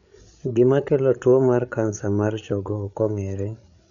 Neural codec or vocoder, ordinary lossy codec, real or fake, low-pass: codec, 16 kHz, 8 kbps, FreqCodec, larger model; none; fake; 7.2 kHz